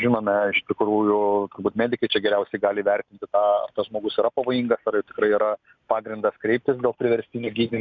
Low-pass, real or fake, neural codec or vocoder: 7.2 kHz; real; none